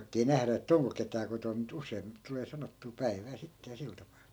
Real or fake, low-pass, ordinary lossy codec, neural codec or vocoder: real; none; none; none